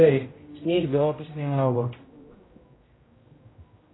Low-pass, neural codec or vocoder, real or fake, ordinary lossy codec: 7.2 kHz; codec, 16 kHz, 0.5 kbps, X-Codec, HuBERT features, trained on general audio; fake; AAC, 16 kbps